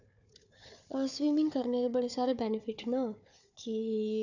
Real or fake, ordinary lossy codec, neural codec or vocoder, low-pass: fake; none; codec, 16 kHz, 4 kbps, FunCodec, trained on Chinese and English, 50 frames a second; 7.2 kHz